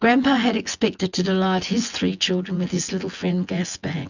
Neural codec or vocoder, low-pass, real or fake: vocoder, 24 kHz, 100 mel bands, Vocos; 7.2 kHz; fake